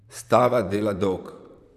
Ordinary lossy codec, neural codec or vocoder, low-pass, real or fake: none; vocoder, 44.1 kHz, 128 mel bands, Pupu-Vocoder; 14.4 kHz; fake